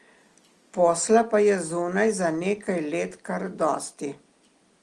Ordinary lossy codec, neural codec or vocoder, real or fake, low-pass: Opus, 24 kbps; none; real; 10.8 kHz